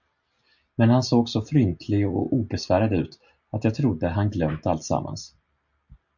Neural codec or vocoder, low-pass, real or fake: none; 7.2 kHz; real